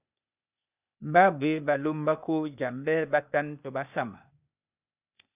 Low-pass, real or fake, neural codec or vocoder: 3.6 kHz; fake; codec, 16 kHz, 0.8 kbps, ZipCodec